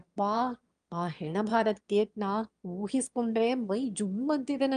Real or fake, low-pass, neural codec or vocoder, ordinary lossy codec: fake; 9.9 kHz; autoencoder, 22.05 kHz, a latent of 192 numbers a frame, VITS, trained on one speaker; Opus, 32 kbps